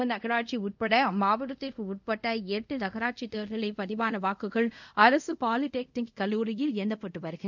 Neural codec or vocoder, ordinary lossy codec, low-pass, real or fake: codec, 24 kHz, 0.5 kbps, DualCodec; none; 7.2 kHz; fake